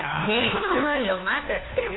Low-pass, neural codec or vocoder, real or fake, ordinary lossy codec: 7.2 kHz; codec, 16 kHz, 1 kbps, FunCodec, trained on LibriTTS, 50 frames a second; fake; AAC, 16 kbps